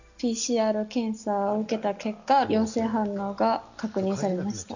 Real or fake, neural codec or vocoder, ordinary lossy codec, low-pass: real; none; none; 7.2 kHz